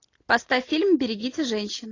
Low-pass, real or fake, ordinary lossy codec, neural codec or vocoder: 7.2 kHz; real; AAC, 32 kbps; none